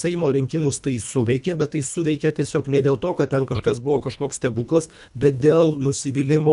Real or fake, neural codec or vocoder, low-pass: fake; codec, 24 kHz, 1.5 kbps, HILCodec; 10.8 kHz